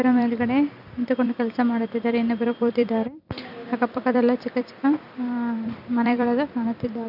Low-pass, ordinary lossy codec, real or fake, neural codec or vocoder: 5.4 kHz; none; fake; vocoder, 44.1 kHz, 128 mel bands every 256 samples, BigVGAN v2